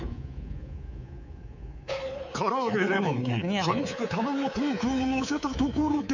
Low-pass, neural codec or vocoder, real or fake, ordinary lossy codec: 7.2 kHz; codec, 24 kHz, 3.1 kbps, DualCodec; fake; none